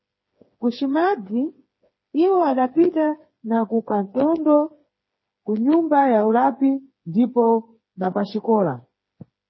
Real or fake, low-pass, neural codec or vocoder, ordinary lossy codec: fake; 7.2 kHz; codec, 16 kHz, 4 kbps, FreqCodec, smaller model; MP3, 24 kbps